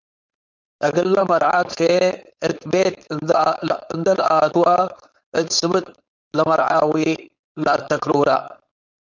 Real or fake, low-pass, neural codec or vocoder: fake; 7.2 kHz; codec, 16 kHz, 4.8 kbps, FACodec